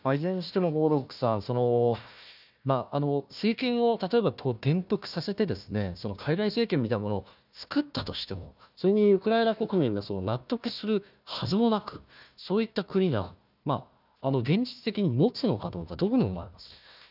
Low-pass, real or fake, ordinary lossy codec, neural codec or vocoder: 5.4 kHz; fake; none; codec, 16 kHz, 1 kbps, FunCodec, trained on Chinese and English, 50 frames a second